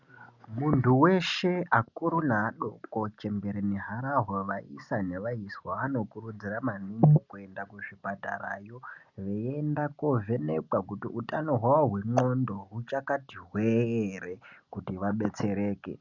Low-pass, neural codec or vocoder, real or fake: 7.2 kHz; none; real